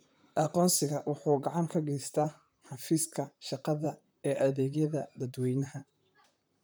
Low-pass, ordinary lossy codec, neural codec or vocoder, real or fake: none; none; vocoder, 44.1 kHz, 128 mel bands, Pupu-Vocoder; fake